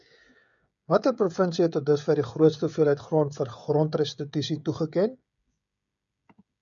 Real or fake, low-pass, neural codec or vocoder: fake; 7.2 kHz; codec, 16 kHz, 16 kbps, FreqCodec, smaller model